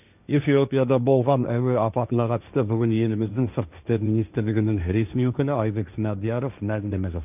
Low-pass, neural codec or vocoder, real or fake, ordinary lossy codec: 3.6 kHz; codec, 16 kHz, 1.1 kbps, Voila-Tokenizer; fake; none